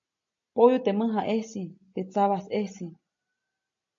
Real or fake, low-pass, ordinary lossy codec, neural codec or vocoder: real; 7.2 kHz; AAC, 48 kbps; none